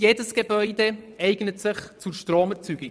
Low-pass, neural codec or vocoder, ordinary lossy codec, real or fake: none; vocoder, 22.05 kHz, 80 mel bands, WaveNeXt; none; fake